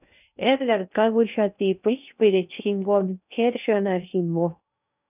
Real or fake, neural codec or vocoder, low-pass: fake; codec, 16 kHz in and 24 kHz out, 0.6 kbps, FocalCodec, streaming, 2048 codes; 3.6 kHz